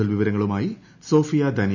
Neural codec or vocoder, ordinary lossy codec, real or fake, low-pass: none; none; real; 7.2 kHz